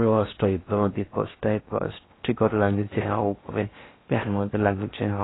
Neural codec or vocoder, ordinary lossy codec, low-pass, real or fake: codec, 16 kHz in and 24 kHz out, 0.6 kbps, FocalCodec, streaming, 4096 codes; AAC, 16 kbps; 7.2 kHz; fake